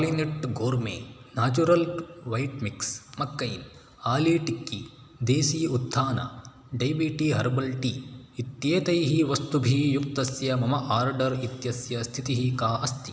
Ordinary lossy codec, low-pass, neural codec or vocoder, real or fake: none; none; none; real